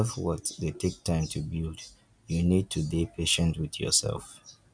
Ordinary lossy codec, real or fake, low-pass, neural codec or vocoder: none; real; 9.9 kHz; none